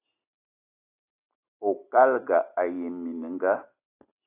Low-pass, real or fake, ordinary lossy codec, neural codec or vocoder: 3.6 kHz; real; AAC, 24 kbps; none